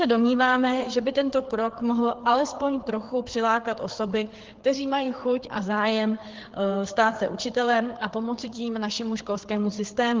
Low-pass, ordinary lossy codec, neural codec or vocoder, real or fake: 7.2 kHz; Opus, 16 kbps; codec, 16 kHz, 4 kbps, FreqCodec, larger model; fake